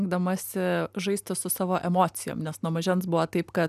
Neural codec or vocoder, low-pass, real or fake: none; 14.4 kHz; real